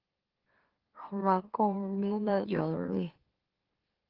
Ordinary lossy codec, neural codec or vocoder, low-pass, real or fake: Opus, 16 kbps; autoencoder, 44.1 kHz, a latent of 192 numbers a frame, MeloTTS; 5.4 kHz; fake